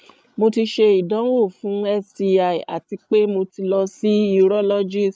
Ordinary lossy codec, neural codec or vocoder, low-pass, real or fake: none; codec, 16 kHz, 16 kbps, FreqCodec, larger model; none; fake